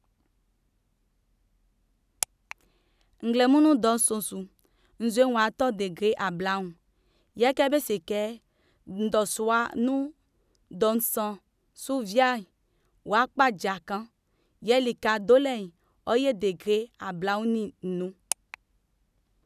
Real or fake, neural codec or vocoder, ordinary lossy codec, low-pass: real; none; none; 14.4 kHz